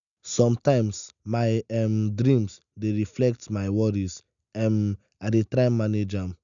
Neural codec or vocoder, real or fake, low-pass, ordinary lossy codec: none; real; 7.2 kHz; none